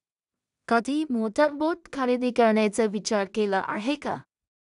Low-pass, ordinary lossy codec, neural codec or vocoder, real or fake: 10.8 kHz; none; codec, 16 kHz in and 24 kHz out, 0.4 kbps, LongCat-Audio-Codec, two codebook decoder; fake